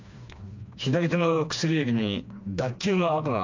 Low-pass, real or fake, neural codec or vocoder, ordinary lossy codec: 7.2 kHz; fake; codec, 16 kHz, 2 kbps, FreqCodec, smaller model; MP3, 64 kbps